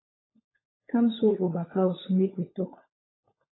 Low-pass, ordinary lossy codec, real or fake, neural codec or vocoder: 7.2 kHz; AAC, 16 kbps; fake; codec, 16 kHz, 16 kbps, FunCodec, trained on LibriTTS, 50 frames a second